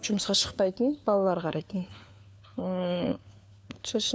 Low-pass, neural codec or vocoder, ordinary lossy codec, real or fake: none; codec, 16 kHz, 4 kbps, FunCodec, trained on LibriTTS, 50 frames a second; none; fake